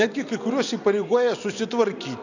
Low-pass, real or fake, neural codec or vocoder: 7.2 kHz; real; none